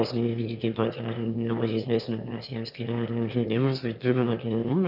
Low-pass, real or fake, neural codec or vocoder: 5.4 kHz; fake; autoencoder, 22.05 kHz, a latent of 192 numbers a frame, VITS, trained on one speaker